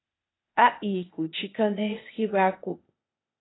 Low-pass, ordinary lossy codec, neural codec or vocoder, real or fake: 7.2 kHz; AAC, 16 kbps; codec, 16 kHz, 0.8 kbps, ZipCodec; fake